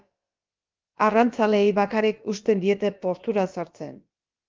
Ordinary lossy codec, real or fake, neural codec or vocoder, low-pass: Opus, 32 kbps; fake; codec, 16 kHz, about 1 kbps, DyCAST, with the encoder's durations; 7.2 kHz